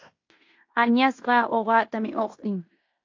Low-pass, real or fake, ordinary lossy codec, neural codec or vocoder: 7.2 kHz; fake; AAC, 48 kbps; codec, 16 kHz in and 24 kHz out, 0.9 kbps, LongCat-Audio-Codec, fine tuned four codebook decoder